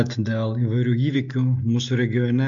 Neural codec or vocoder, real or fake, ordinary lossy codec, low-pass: none; real; MP3, 64 kbps; 7.2 kHz